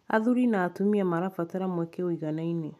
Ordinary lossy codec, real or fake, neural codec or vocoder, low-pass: none; real; none; 14.4 kHz